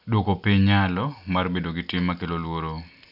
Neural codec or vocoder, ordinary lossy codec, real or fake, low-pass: none; none; real; 5.4 kHz